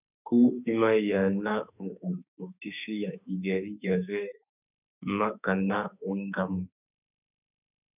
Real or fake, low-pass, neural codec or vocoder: fake; 3.6 kHz; autoencoder, 48 kHz, 32 numbers a frame, DAC-VAE, trained on Japanese speech